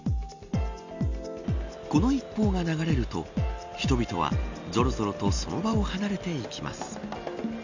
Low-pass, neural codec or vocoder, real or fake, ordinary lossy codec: 7.2 kHz; none; real; none